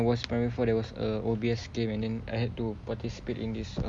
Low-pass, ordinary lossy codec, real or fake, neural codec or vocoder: 9.9 kHz; none; real; none